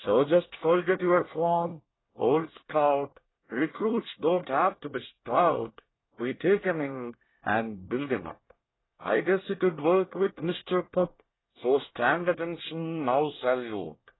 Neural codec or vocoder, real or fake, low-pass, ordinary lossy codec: codec, 24 kHz, 1 kbps, SNAC; fake; 7.2 kHz; AAC, 16 kbps